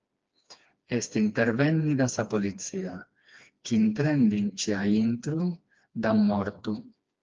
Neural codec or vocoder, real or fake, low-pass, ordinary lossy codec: codec, 16 kHz, 2 kbps, FreqCodec, smaller model; fake; 7.2 kHz; Opus, 24 kbps